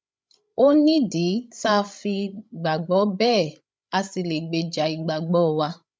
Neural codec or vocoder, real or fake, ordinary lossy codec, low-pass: codec, 16 kHz, 16 kbps, FreqCodec, larger model; fake; none; none